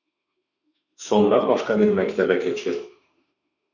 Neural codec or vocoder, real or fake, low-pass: autoencoder, 48 kHz, 32 numbers a frame, DAC-VAE, trained on Japanese speech; fake; 7.2 kHz